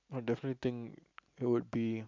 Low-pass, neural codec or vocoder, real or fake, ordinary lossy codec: 7.2 kHz; none; real; MP3, 64 kbps